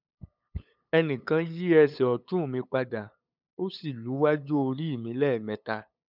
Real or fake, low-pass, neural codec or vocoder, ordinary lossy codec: fake; 5.4 kHz; codec, 16 kHz, 8 kbps, FunCodec, trained on LibriTTS, 25 frames a second; none